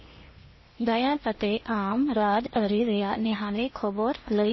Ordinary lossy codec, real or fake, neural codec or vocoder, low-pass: MP3, 24 kbps; fake; codec, 16 kHz in and 24 kHz out, 0.8 kbps, FocalCodec, streaming, 65536 codes; 7.2 kHz